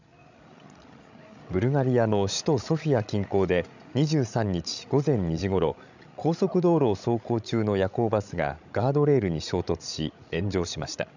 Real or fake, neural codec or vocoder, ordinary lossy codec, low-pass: fake; codec, 16 kHz, 16 kbps, FreqCodec, larger model; none; 7.2 kHz